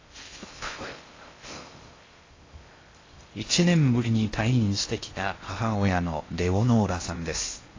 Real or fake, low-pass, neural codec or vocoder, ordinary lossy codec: fake; 7.2 kHz; codec, 16 kHz in and 24 kHz out, 0.6 kbps, FocalCodec, streaming, 2048 codes; AAC, 32 kbps